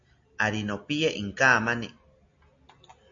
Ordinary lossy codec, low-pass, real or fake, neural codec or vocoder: MP3, 48 kbps; 7.2 kHz; real; none